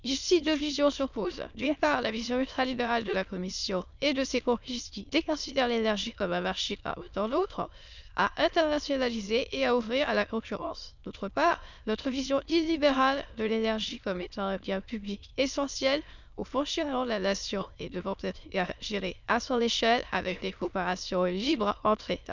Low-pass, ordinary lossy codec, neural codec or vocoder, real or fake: 7.2 kHz; none; autoencoder, 22.05 kHz, a latent of 192 numbers a frame, VITS, trained on many speakers; fake